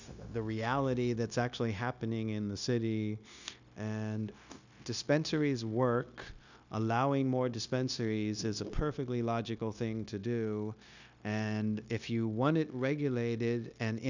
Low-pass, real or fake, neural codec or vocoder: 7.2 kHz; fake; codec, 16 kHz, 0.9 kbps, LongCat-Audio-Codec